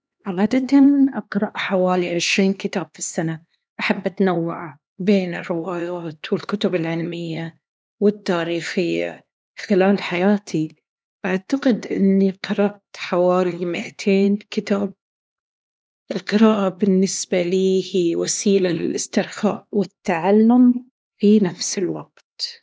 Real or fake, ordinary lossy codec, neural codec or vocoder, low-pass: fake; none; codec, 16 kHz, 2 kbps, X-Codec, HuBERT features, trained on LibriSpeech; none